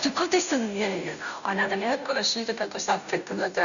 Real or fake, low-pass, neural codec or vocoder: fake; 7.2 kHz; codec, 16 kHz, 0.5 kbps, FunCodec, trained on Chinese and English, 25 frames a second